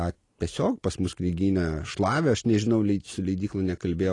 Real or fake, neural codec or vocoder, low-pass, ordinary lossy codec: real; none; 10.8 kHz; AAC, 32 kbps